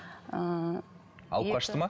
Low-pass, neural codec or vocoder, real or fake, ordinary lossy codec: none; none; real; none